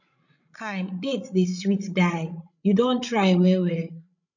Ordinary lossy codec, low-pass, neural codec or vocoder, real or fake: none; 7.2 kHz; codec, 16 kHz, 16 kbps, FreqCodec, larger model; fake